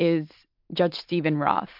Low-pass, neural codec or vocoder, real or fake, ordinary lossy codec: 5.4 kHz; none; real; MP3, 48 kbps